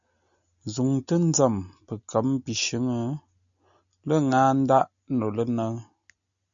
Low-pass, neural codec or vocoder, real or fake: 7.2 kHz; none; real